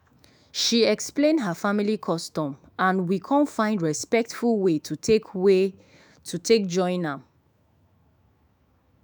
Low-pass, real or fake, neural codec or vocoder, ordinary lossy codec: none; fake; autoencoder, 48 kHz, 128 numbers a frame, DAC-VAE, trained on Japanese speech; none